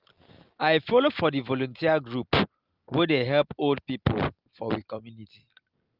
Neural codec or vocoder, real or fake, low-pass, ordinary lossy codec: none; real; 5.4 kHz; Opus, 32 kbps